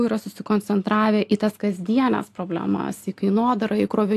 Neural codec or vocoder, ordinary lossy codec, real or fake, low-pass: none; AAC, 64 kbps; real; 14.4 kHz